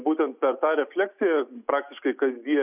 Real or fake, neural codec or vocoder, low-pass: real; none; 3.6 kHz